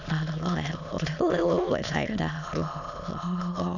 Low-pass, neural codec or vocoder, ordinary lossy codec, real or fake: 7.2 kHz; autoencoder, 22.05 kHz, a latent of 192 numbers a frame, VITS, trained on many speakers; none; fake